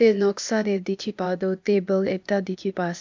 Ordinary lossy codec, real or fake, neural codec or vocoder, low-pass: MP3, 64 kbps; fake; codec, 16 kHz, 0.8 kbps, ZipCodec; 7.2 kHz